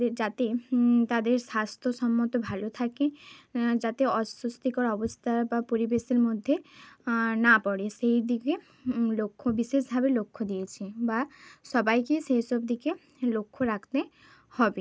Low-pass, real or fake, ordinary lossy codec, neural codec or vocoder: none; real; none; none